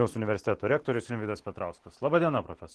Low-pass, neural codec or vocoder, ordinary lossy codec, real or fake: 10.8 kHz; none; Opus, 16 kbps; real